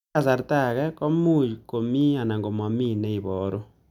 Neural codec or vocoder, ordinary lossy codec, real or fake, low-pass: none; none; real; 19.8 kHz